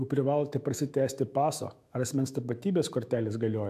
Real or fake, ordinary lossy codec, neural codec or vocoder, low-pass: fake; AAC, 96 kbps; autoencoder, 48 kHz, 128 numbers a frame, DAC-VAE, trained on Japanese speech; 14.4 kHz